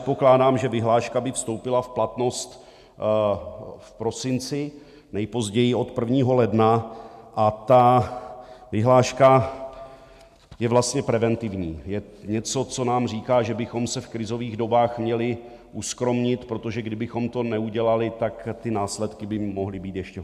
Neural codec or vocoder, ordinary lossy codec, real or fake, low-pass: none; MP3, 96 kbps; real; 14.4 kHz